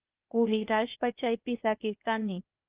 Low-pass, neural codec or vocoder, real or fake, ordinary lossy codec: 3.6 kHz; codec, 16 kHz, 0.8 kbps, ZipCodec; fake; Opus, 32 kbps